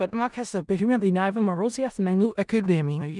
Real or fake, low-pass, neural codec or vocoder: fake; 10.8 kHz; codec, 16 kHz in and 24 kHz out, 0.4 kbps, LongCat-Audio-Codec, four codebook decoder